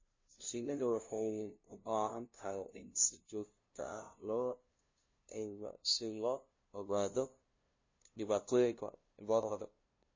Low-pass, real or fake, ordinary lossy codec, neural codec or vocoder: 7.2 kHz; fake; MP3, 32 kbps; codec, 16 kHz, 0.5 kbps, FunCodec, trained on LibriTTS, 25 frames a second